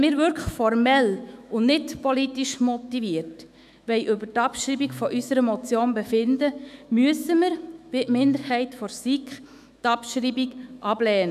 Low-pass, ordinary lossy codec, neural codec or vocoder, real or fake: 14.4 kHz; none; autoencoder, 48 kHz, 128 numbers a frame, DAC-VAE, trained on Japanese speech; fake